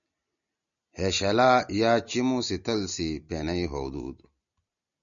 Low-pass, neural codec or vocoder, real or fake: 7.2 kHz; none; real